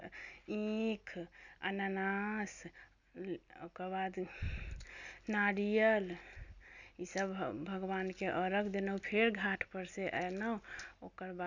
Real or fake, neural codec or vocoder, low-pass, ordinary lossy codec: real; none; 7.2 kHz; none